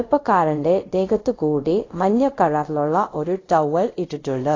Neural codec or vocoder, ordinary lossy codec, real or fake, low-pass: codec, 24 kHz, 0.5 kbps, DualCodec; AAC, 32 kbps; fake; 7.2 kHz